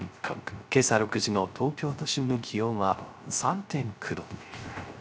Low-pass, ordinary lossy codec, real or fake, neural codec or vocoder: none; none; fake; codec, 16 kHz, 0.3 kbps, FocalCodec